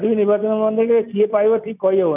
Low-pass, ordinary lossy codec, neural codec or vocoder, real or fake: 3.6 kHz; none; none; real